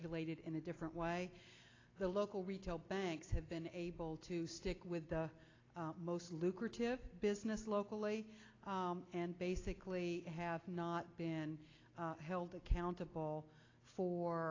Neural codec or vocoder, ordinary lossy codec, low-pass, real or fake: none; AAC, 32 kbps; 7.2 kHz; real